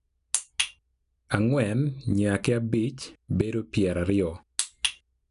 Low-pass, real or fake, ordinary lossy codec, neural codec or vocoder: 10.8 kHz; real; none; none